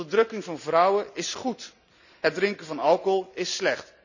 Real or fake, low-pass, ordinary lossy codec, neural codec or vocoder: real; 7.2 kHz; none; none